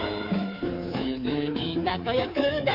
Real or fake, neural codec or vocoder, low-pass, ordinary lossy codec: fake; codec, 24 kHz, 3.1 kbps, DualCodec; 5.4 kHz; none